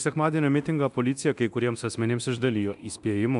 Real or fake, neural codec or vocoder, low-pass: fake; codec, 24 kHz, 0.9 kbps, DualCodec; 10.8 kHz